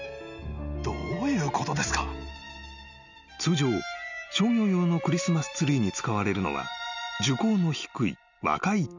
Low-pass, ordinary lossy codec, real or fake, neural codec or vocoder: 7.2 kHz; none; real; none